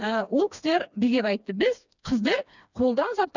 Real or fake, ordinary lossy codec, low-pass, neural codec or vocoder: fake; none; 7.2 kHz; codec, 16 kHz, 2 kbps, FreqCodec, smaller model